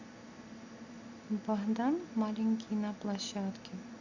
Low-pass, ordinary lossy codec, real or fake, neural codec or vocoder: 7.2 kHz; Opus, 64 kbps; real; none